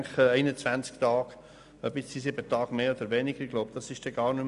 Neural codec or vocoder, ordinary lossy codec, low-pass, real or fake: none; none; 10.8 kHz; real